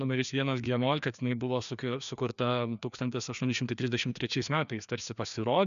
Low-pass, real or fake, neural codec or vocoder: 7.2 kHz; fake; codec, 16 kHz, 2 kbps, FreqCodec, larger model